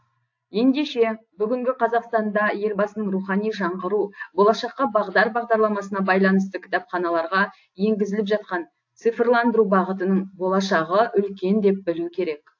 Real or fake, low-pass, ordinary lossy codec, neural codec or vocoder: real; 7.2 kHz; AAC, 48 kbps; none